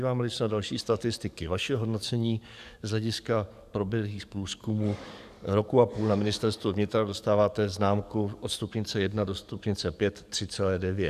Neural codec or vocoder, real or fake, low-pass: codec, 44.1 kHz, 7.8 kbps, DAC; fake; 14.4 kHz